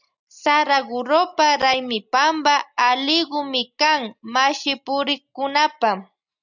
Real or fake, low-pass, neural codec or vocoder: real; 7.2 kHz; none